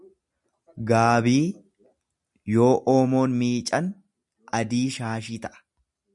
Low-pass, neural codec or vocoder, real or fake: 10.8 kHz; none; real